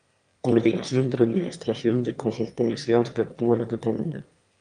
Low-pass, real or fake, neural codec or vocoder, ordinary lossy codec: 9.9 kHz; fake; autoencoder, 22.05 kHz, a latent of 192 numbers a frame, VITS, trained on one speaker; Opus, 32 kbps